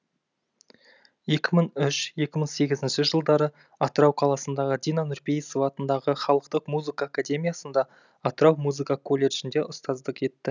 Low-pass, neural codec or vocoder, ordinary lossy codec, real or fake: 7.2 kHz; none; none; real